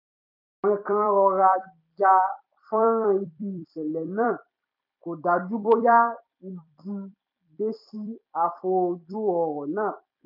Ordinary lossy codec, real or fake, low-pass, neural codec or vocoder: none; fake; 5.4 kHz; vocoder, 44.1 kHz, 128 mel bands every 256 samples, BigVGAN v2